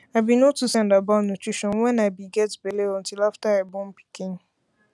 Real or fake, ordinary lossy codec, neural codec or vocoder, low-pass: real; none; none; none